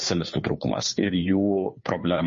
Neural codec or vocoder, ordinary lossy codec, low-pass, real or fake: codec, 16 kHz, 2 kbps, FunCodec, trained on Chinese and English, 25 frames a second; MP3, 32 kbps; 7.2 kHz; fake